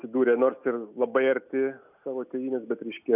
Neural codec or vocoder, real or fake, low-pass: none; real; 3.6 kHz